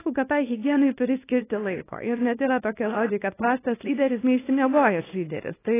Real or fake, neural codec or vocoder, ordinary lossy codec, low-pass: fake; codec, 24 kHz, 0.9 kbps, WavTokenizer, small release; AAC, 16 kbps; 3.6 kHz